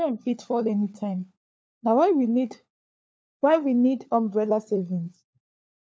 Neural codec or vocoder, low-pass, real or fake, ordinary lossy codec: codec, 16 kHz, 4 kbps, FunCodec, trained on LibriTTS, 50 frames a second; none; fake; none